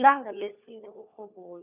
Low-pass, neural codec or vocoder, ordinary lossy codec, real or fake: 3.6 kHz; codec, 16 kHz, 4 kbps, FunCodec, trained on LibriTTS, 50 frames a second; none; fake